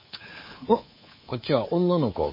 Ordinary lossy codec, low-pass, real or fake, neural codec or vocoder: MP3, 24 kbps; 5.4 kHz; fake; codec, 24 kHz, 3.1 kbps, DualCodec